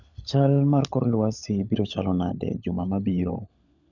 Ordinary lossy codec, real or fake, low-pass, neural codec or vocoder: none; fake; 7.2 kHz; codec, 16 kHz, 16 kbps, FunCodec, trained on LibriTTS, 50 frames a second